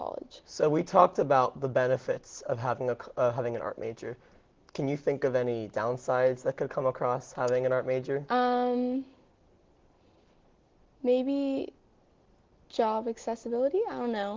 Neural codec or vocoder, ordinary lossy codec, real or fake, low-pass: none; Opus, 16 kbps; real; 7.2 kHz